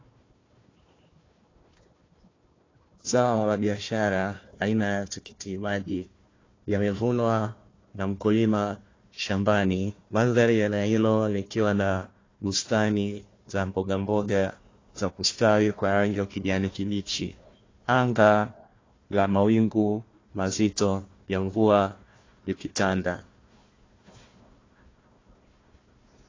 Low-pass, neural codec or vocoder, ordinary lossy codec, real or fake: 7.2 kHz; codec, 16 kHz, 1 kbps, FunCodec, trained on Chinese and English, 50 frames a second; AAC, 32 kbps; fake